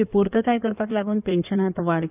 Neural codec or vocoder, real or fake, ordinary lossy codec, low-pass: codec, 16 kHz in and 24 kHz out, 2.2 kbps, FireRedTTS-2 codec; fake; none; 3.6 kHz